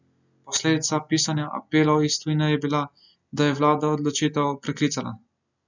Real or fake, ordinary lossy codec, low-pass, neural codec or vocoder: real; none; 7.2 kHz; none